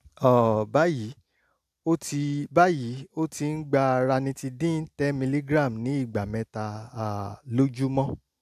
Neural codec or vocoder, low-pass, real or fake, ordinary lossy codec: none; 14.4 kHz; real; AAC, 96 kbps